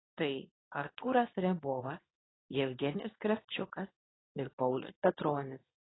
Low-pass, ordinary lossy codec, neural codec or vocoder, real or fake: 7.2 kHz; AAC, 16 kbps; codec, 24 kHz, 0.9 kbps, WavTokenizer, medium speech release version 2; fake